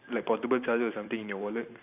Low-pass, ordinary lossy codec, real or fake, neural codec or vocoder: 3.6 kHz; none; fake; vocoder, 44.1 kHz, 128 mel bands every 512 samples, BigVGAN v2